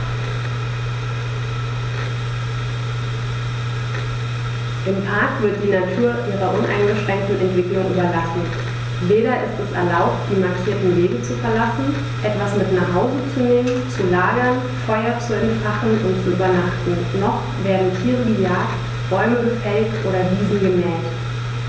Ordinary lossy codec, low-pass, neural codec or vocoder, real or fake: none; none; none; real